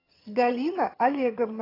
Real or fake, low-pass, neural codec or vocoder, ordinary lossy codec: fake; 5.4 kHz; vocoder, 22.05 kHz, 80 mel bands, HiFi-GAN; AAC, 24 kbps